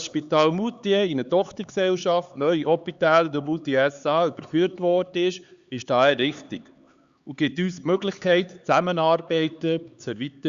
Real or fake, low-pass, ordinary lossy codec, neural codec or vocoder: fake; 7.2 kHz; Opus, 64 kbps; codec, 16 kHz, 4 kbps, X-Codec, HuBERT features, trained on LibriSpeech